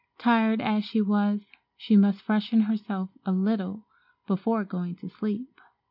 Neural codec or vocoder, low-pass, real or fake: none; 5.4 kHz; real